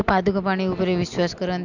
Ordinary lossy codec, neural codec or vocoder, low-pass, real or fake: Opus, 64 kbps; none; 7.2 kHz; real